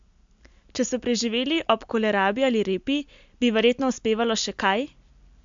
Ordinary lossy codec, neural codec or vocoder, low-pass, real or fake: MP3, 64 kbps; none; 7.2 kHz; real